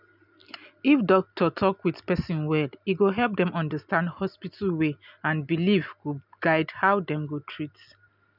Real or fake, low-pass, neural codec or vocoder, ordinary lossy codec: real; 5.4 kHz; none; none